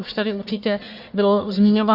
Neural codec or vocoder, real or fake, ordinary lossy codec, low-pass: codec, 44.1 kHz, 1.7 kbps, Pupu-Codec; fake; Opus, 64 kbps; 5.4 kHz